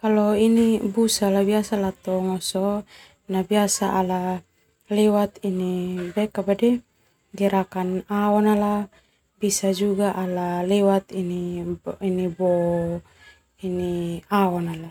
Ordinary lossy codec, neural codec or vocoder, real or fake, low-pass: none; none; real; 19.8 kHz